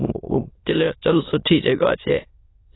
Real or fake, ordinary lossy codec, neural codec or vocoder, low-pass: fake; AAC, 16 kbps; autoencoder, 22.05 kHz, a latent of 192 numbers a frame, VITS, trained on many speakers; 7.2 kHz